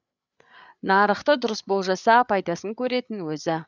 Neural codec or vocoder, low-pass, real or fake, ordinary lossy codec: codec, 16 kHz, 8 kbps, FreqCodec, larger model; 7.2 kHz; fake; none